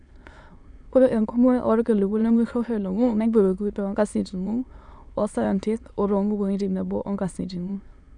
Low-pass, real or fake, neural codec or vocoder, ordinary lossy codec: 9.9 kHz; fake; autoencoder, 22.05 kHz, a latent of 192 numbers a frame, VITS, trained on many speakers; none